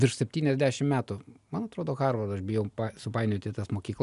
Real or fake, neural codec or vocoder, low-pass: real; none; 10.8 kHz